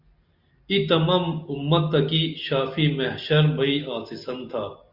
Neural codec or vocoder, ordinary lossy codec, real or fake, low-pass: none; Opus, 64 kbps; real; 5.4 kHz